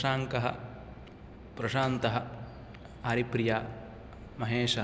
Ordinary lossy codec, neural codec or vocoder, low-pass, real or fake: none; none; none; real